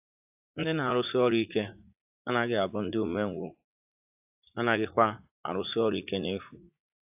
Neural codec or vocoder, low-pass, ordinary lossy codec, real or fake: vocoder, 44.1 kHz, 80 mel bands, Vocos; 3.6 kHz; AAC, 32 kbps; fake